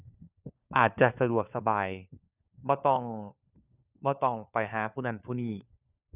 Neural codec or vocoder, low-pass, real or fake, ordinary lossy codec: codec, 16 kHz, 4 kbps, FunCodec, trained on LibriTTS, 50 frames a second; 3.6 kHz; fake; none